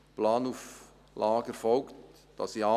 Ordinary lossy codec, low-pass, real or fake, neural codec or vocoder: none; 14.4 kHz; real; none